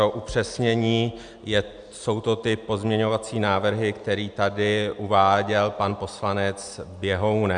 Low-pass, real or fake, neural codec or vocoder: 9.9 kHz; real; none